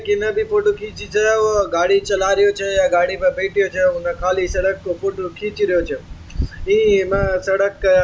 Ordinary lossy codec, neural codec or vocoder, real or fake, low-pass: none; none; real; none